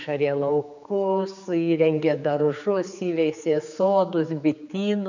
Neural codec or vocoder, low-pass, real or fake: codec, 16 kHz, 4 kbps, X-Codec, HuBERT features, trained on general audio; 7.2 kHz; fake